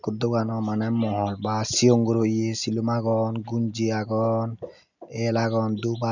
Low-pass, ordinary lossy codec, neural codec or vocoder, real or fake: 7.2 kHz; none; none; real